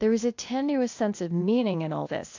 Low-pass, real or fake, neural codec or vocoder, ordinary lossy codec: 7.2 kHz; fake; codec, 16 kHz, 0.8 kbps, ZipCodec; AAC, 48 kbps